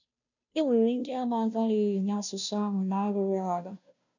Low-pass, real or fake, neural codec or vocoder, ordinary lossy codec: 7.2 kHz; fake; codec, 16 kHz, 0.5 kbps, FunCodec, trained on Chinese and English, 25 frames a second; none